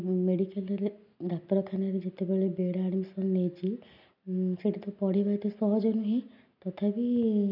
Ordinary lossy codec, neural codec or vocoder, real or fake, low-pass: none; none; real; 5.4 kHz